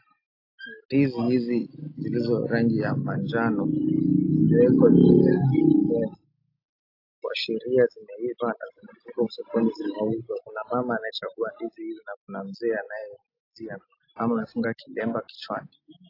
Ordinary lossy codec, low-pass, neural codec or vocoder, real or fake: AAC, 32 kbps; 5.4 kHz; none; real